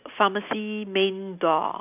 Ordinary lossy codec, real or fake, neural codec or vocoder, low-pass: none; real; none; 3.6 kHz